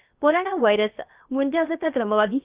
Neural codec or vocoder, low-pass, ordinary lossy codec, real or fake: codec, 16 kHz, about 1 kbps, DyCAST, with the encoder's durations; 3.6 kHz; Opus, 24 kbps; fake